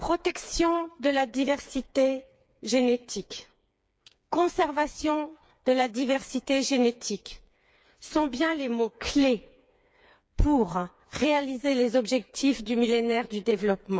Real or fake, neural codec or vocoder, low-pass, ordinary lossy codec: fake; codec, 16 kHz, 4 kbps, FreqCodec, smaller model; none; none